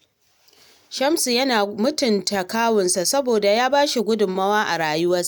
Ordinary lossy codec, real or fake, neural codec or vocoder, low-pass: none; real; none; none